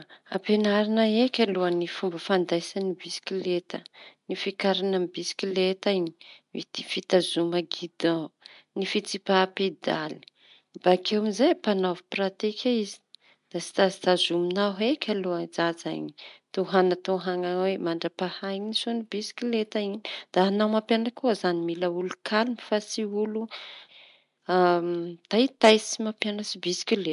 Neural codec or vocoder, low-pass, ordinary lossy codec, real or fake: none; 10.8 kHz; MP3, 64 kbps; real